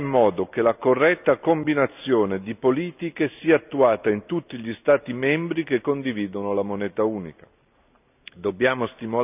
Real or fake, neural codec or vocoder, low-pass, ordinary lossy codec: real; none; 3.6 kHz; none